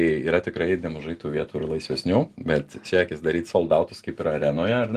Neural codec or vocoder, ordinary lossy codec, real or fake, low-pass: none; Opus, 24 kbps; real; 14.4 kHz